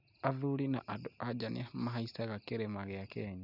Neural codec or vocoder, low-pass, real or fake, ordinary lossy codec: none; 5.4 kHz; real; none